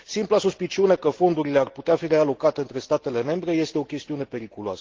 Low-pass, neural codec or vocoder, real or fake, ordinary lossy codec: 7.2 kHz; none; real; Opus, 16 kbps